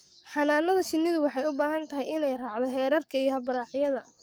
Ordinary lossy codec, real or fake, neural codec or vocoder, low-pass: none; fake; codec, 44.1 kHz, 7.8 kbps, DAC; none